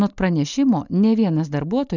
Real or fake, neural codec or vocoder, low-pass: real; none; 7.2 kHz